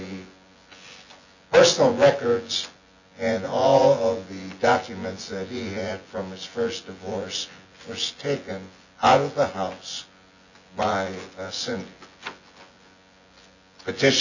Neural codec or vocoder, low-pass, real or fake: vocoder, 24 kHz, 100 mel bands, Vocos; 7.2 kHz; fake